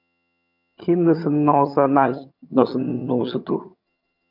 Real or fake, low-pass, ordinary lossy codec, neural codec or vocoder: fake; 5.4 kHz; MP3, 48 kbps; vocoder, 22.05 kHz, 80 mel bands, HiFi-GAN